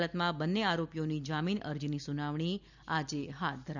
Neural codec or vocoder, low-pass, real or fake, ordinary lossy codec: none; 7.2 kHz; real; none